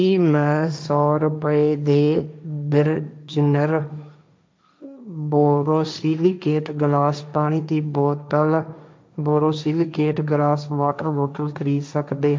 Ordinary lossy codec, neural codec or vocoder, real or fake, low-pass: none; codec, 16 kHz, 1.1 kbps, Voila-Tokenizer; fake; none